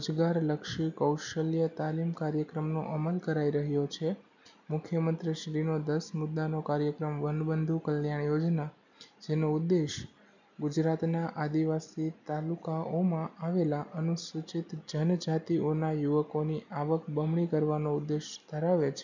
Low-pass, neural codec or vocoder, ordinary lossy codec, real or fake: 7.2 kHz; none; none; real